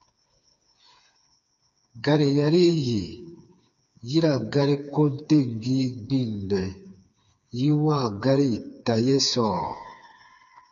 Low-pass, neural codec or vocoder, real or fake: 7.2 kHz; codec, 16 kHz, 4 kbps, FreqCodec, smaller model; fake